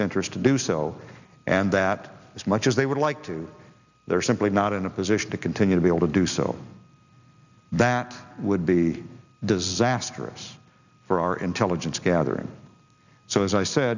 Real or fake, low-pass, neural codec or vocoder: real; 7.2 kHz; none